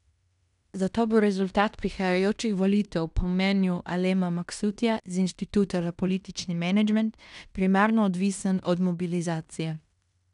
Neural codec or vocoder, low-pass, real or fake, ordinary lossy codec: codec, 16 kHz in and 24 kHz out, 0.9 kbps, LongCat-Audio-Codec, fine tuned four codebook decoder; 10.8 kHz; fake; none